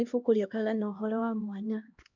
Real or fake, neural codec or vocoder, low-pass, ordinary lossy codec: fake; codec, 16 kHz, 1 kbps, X-Codec, HuBERT features, trained on LibriSpeech; 7.2 kHz; none